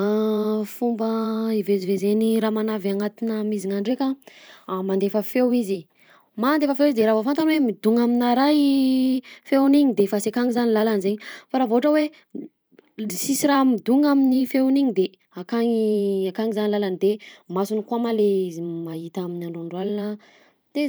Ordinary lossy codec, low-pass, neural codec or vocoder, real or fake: none; none; vocoder, 44.1 kHz, 128 mel bands every 512 samples, BigVGAN v2; fake